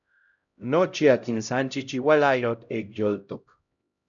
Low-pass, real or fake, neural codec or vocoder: 7.2 kHz; fake; codec, 16 kHz, 0.5 kbps, X-Codec, HuBERT features, trained on LibriSpeech